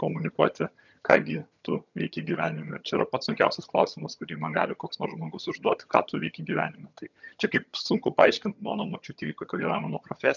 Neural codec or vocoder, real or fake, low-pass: vocoder, 22.05 kHz, 80 mel bands, HiFi-GAN; fake; 7.2 kHz